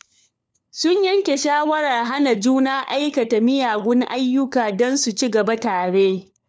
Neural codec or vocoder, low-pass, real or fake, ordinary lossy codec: codec, 16 kHz, 4 kbps, FunCodec, trained on LibriTTS, 50 frames a second; none; fake; none